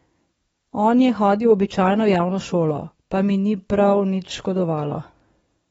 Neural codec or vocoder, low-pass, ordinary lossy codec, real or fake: codec, 44.1 kHz, 7.8 kbps, DAC; 19.8 kHz; AAC, 24 kbps; fake